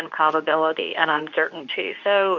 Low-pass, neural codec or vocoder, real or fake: 7.2 kHz; codec, 24 kHz, 0.9 kbps, WavTokenizer, medium speech release version 2; fake